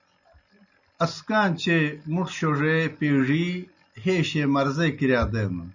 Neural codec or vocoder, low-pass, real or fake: none; 7.2 kHz; real